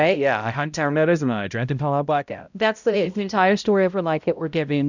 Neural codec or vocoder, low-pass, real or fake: codec, 16 kHz, 0.5 kbps, X-Codec, HuBERT features, trained on balanced general audio; 7.2 kHz; fake